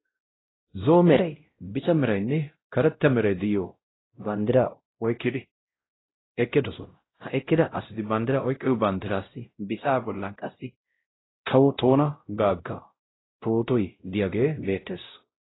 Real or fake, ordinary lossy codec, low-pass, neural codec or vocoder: fake; AAC, 16 kbps; 7.2 kHz; codec, 16 kHz, 0.5 kbps, X-Codec, WavLM features, trained on Multilingual LibriSpeech